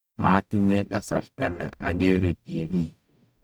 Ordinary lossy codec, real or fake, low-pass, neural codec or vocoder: none; fake; none; codec, 44.1 kHz, 0.9 kbps, DAC